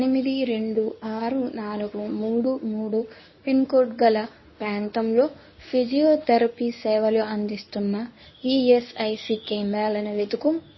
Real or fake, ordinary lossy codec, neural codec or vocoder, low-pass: fake; MP3, 24 kbps; codec, 24 kHz, 0.9 kbps, WavTokenizer, medium speech release version 1; 7.2 kHz